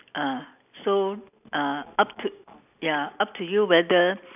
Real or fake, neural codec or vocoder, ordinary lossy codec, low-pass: real; none; none; 3.6 kHz